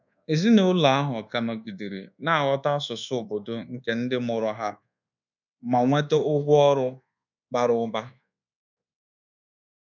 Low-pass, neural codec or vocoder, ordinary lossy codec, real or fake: 7.2 kHz; codec, 24 kHz, 1.2 kbps, DualCodec; none; fake